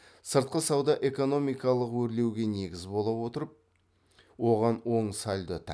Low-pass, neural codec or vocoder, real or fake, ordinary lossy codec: none; none; real; none